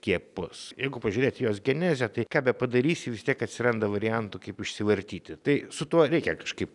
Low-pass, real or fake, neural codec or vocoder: 10.8 kHz; real; none